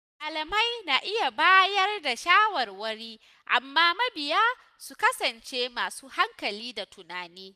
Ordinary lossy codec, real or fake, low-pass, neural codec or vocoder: none; real; 14.4 kHz; none